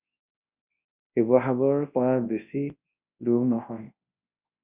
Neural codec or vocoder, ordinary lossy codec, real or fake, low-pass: codec, 24 kHz, 0.9 kbps, WavTokenizer, large speech release; Opus, 64 kbps; fake; 3.6 kHz